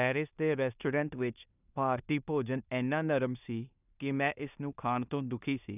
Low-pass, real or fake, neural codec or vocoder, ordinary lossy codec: 3.6 kHz; fake; codec, 16 kHz in and 24 kHz out, 0.9 kbps, LongCat-Audio-Codec, four codebook decoder; none